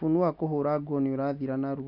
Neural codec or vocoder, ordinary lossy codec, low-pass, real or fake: none; none; 5.4 kHz; real